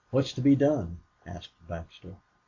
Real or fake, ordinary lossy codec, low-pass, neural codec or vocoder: real; AAC, 48 kbps; 7.2 kHz; none